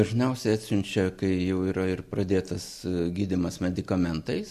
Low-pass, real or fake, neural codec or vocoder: 14.4 kHz; real; none